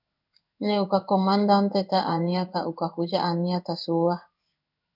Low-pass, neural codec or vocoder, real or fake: 5.4 kHz; codec, 16 kHz in and 24 kHz out, 1 kbps, XY-Tokenizer; fake